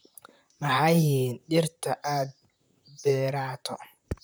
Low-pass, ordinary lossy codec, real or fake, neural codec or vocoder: none; none; fake; vocoder, 44.1 kHz, 128 mel bands, Pupu-Vocoder